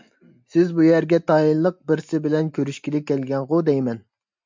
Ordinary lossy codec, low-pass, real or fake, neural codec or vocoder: MP3, 64 kbps; 7.2 kHz; real; none